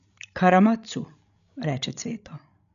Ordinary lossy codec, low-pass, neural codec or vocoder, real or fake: none; 7.2 kHz; codec, 16 kHz, 16 kbps, FreqCodec, larger model; fake